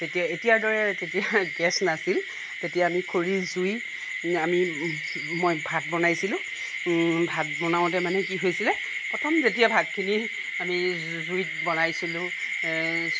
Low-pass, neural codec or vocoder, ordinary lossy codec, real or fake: none; none; none; real